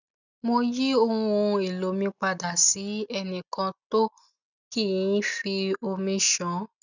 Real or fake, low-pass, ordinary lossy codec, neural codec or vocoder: real; 7.2 kHz; none; none